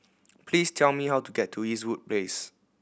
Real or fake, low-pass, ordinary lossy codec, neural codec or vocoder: real; none; none; none